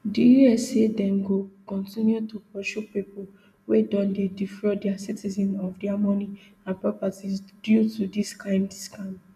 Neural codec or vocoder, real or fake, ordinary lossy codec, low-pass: none; real; none; 14.4 kHz